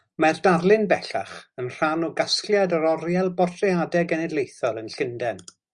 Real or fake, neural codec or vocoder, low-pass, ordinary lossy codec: real; none; 9.9 kHz; Opus, 64 kbps